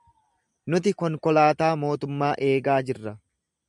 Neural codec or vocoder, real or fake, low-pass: none; real; 10.8 kHz